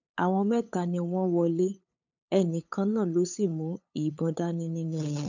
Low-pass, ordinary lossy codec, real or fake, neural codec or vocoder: 7.2 kHz; none; fake; codec, 16 kHz, 8 kbps, FunCodec, trained on LibriTTS, 25 frames a second